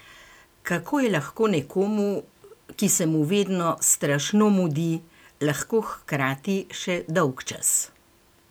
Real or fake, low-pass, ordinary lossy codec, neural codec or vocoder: real; none; none; none